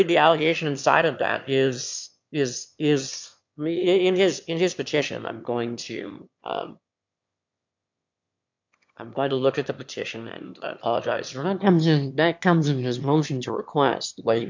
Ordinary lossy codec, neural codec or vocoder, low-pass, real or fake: MP3, 64 kbps; autoencoder, 22.05 kHz, a latent of 192 numbers a frame, VITS, trained on one speaker; 7.2 kHz; fake